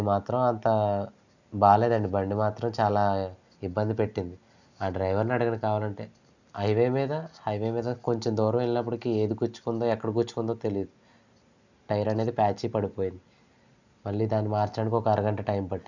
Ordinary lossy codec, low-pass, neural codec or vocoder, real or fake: none; 7.2 kHz; none; real